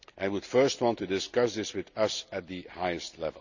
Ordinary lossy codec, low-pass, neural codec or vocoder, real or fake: none; 7.2 kHz; none; real